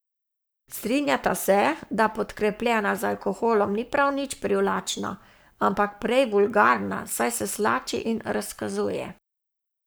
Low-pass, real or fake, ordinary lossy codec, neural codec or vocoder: none; fake; none; codec, 44.1 kHz, 7.8 kbps, Pupu-Codec